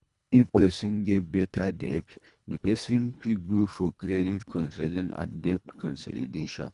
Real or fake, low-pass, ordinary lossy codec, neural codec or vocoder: fake; 10.8 kHz; none; codec, 24 kHz, 1.5 kbps, HILCodec